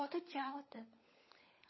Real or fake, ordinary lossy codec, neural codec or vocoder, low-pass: fake; MP3, 24 kbps; codec, 16 kHz, 16 kbps, FunCodec, trained on LibriTTS, 50 frames a second; 7.2 kHz